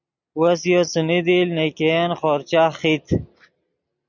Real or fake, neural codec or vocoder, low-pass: real; none; 7.2 kHz